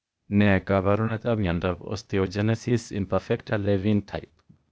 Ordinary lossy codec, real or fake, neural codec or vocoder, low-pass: none; fake; codec, 16 kHz, 0.8 kbps, ZipCodec; none